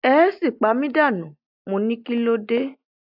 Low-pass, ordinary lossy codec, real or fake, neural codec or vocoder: 5.4 kHz; AAC, 48 kbps; real; none